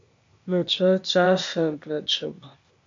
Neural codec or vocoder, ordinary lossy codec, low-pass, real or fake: codec, 16 kHz, 0.8 kbps, ZipCodec; MP3, 48 kbps; 7.2 kHz; fake